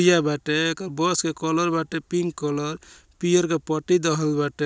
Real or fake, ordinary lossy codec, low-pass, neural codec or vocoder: real; none; none; none